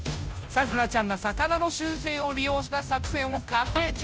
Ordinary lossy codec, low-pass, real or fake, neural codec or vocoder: none; none; fake; codec, 16 kHz, 0.5 kbps, FunCodec, trained on Chinese and English, 25 frames a second